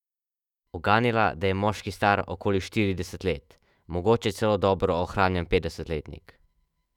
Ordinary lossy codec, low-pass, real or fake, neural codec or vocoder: none; 19.8 kHz; fake; autoencoder, 48 kHz, 128 numbers a frame, DAC-VAE, trained on Japanese speech